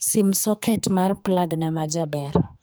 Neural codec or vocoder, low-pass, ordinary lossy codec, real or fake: codec, 44.1 kHz, 2.6 kbps, SNAC; none; none; fake